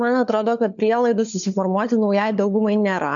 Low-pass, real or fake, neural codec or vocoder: 7.2 kHz; fake; codec, 16 kHz, 4 kbps, FunCodec, trained on LibriTTS, 50 frames a second